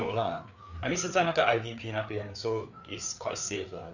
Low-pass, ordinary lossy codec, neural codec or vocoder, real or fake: 7.2 kHz; none; codec, 16 kHz, 4 kbps, FreqCodec, larger model; fake